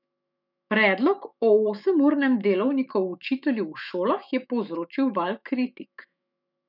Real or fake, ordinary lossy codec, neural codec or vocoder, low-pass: fake; none; autoencoder, 48 kHz, 128 numbers a frame, DAC-VAE, trained on Japanese speech; 5.4 kHz